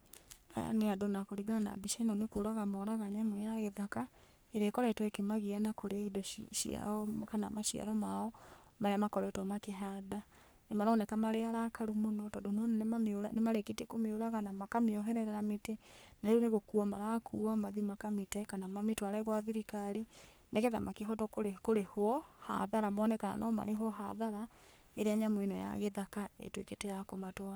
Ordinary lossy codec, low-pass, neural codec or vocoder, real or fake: none; none; codec, 44.1 kHz, 3.4 kbps, Pupu-Codec; fake